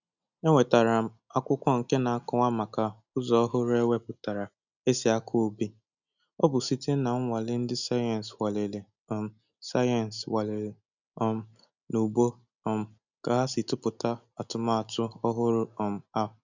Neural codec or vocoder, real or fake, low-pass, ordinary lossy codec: none; real; 7.2 kHz; none